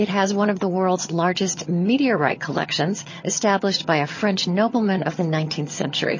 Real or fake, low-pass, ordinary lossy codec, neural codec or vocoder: fake; 7.2 kHz; MP3, 32 kbps; vocoder, 22.05 kHz, 80 mel bands, HiFi-GAN